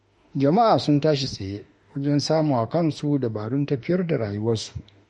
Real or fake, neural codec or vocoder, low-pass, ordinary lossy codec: fake; autoencoder, 48 kHz, 32 numbers a frame, DAC-VAE, trained on Japanese speech; 19.8 kHz; MP3, 48 kbps